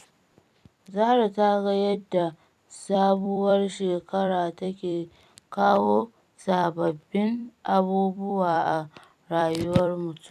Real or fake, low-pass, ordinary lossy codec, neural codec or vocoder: fake; 14.4 kHz; none; vocoder, 44.1 kHz, 128 mel bands every 256 samples, BigVGAN v2